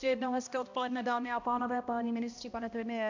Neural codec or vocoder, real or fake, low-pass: codec, 16 kHz, 1 kbps, X-Codec, HuBERT features, trained on balanced general audio; fake; 7.2 kHz